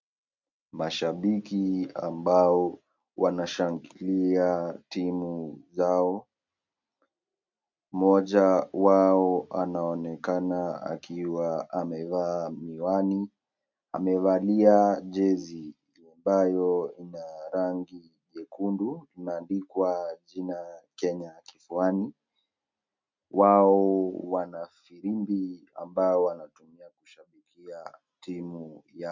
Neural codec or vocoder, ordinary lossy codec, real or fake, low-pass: none; MP3, 64 kbps; real; 7.2 kHz